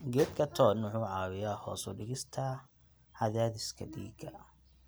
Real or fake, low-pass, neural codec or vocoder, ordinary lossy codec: real; none; none; none